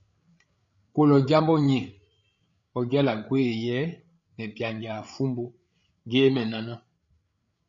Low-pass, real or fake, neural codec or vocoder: 7.2 kHz; fake; codec, 16 kHz, 8 kbps, FreqCodec, larger model